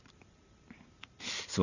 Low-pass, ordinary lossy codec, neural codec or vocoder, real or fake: 7.2 kHz; none; vocoder, 44.1 kHz, 128 mel bands every 512 samples, BigVGAN v2; fake